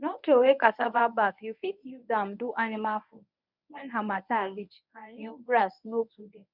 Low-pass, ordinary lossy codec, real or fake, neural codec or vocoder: 5.4 kHz; none; fake; codec, 24 kHz, 0.9 kbps, WavTokenizer, medium speech release version 1